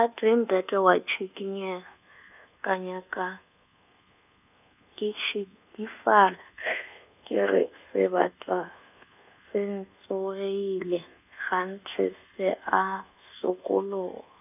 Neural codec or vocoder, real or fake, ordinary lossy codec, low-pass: codec, 24 kHz, 1.2 kbps, DualCodec; fake; none; 3.6 kHz